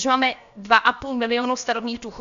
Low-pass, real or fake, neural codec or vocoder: 7.2 kHz; fake; codec, 16 kHz, about 1 kbps, DyCAST, with the encoder's durations